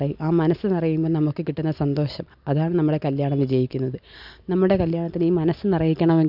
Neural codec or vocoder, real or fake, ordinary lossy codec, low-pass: none; real; none; 5.4 kHz